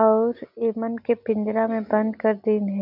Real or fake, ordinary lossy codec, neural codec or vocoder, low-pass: real; none; none; 5.4 kHz